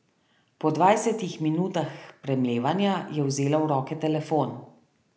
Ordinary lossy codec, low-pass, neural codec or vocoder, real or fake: none; none; none; real